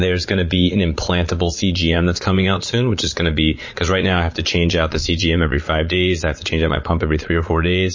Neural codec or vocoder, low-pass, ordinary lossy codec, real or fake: vocoder, 22.05 kHz, 80 mel bands, Vocos; 7.2 kHz; MP3, 32 kbps; fake